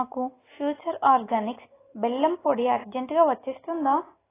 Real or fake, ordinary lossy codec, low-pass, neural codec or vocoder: real; AAC, 16 kbps; 3.6 kHz; none